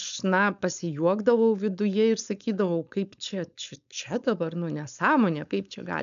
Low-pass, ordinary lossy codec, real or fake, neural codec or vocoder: 7.2 kHz; AAC, 96 kbps; fake; codec, 16 kHz, 4.8 kbps, FACodec